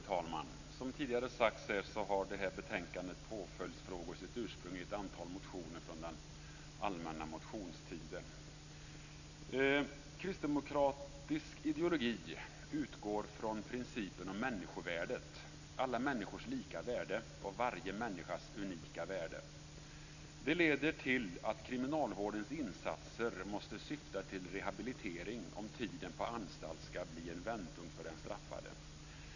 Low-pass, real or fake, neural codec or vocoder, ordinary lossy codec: 7.2 kHz; real; none; none